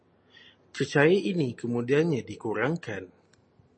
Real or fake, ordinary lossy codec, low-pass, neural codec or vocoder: real; MP3, 32 kbps; 9.9 kHz; none